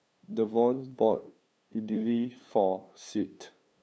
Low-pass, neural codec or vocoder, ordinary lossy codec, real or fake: none; codec, 16 kHz, 2 kbps, FunCodec, trained on LibriTTS, 25 frames a second; none; fake